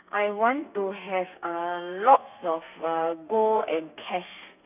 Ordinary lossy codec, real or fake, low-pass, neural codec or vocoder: none; fake; 3.6 kHz; codec, 32 kHz, 1.9 kbps, SNAC